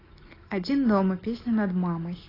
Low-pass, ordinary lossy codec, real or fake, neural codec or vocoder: 5.4 kHz; AAC, 24 kbps; real; none